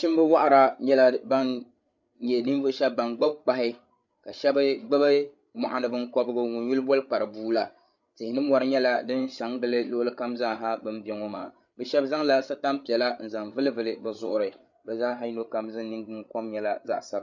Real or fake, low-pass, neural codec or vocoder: fake; 7.2 kHz; codec, 16 kHz, 8 kbps, FreqCodec, larger model